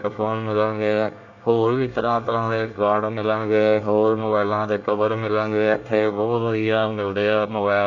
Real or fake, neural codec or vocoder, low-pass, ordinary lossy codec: fake; codec, 24 kHz, 1 kbps, SNAC; 7.2 kHz; none